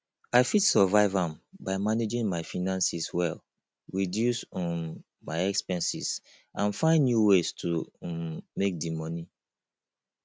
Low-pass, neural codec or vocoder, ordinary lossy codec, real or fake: none; none; none; real